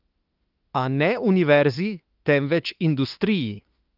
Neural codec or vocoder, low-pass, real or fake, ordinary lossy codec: autoencoder, 48 kHz, 32 numbers a frame, DAC-VAE, trained on Japanese speech; 5.4 kHz; fake; Opus, 24 kbps